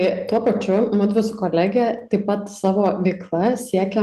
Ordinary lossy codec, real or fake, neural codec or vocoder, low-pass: Opus, 32 kbps; real; none; 14.4 kHz